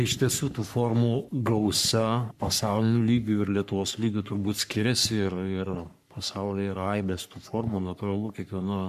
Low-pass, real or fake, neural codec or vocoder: 14.4 kHz; fake; codec, 44.1 kHz, 3.4 kbps, Pupu-Codec